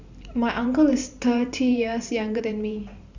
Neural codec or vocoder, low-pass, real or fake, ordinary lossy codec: vocoder, 44.1 kHz, 128 mel bands every 512 samples, BigVGAN v2; 7.2 kHz; fake; Opus, 64 kbps